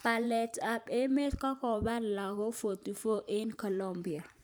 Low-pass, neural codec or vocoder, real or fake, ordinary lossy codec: none; vocoder, 44.1 kHz, 128 mel bands every 512 samples, BigVGAN v2; fake; none